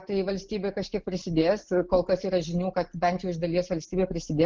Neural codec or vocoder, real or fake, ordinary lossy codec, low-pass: none; real; Opus, 16 kbps; 7.2 kHz